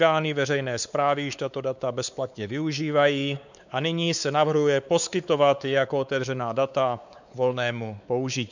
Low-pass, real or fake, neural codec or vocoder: 7.2 kHz; fake; codec, 16 kHz, 4 kbps, X-Codec, WavLM features, trained on Multilingual LibriSpeech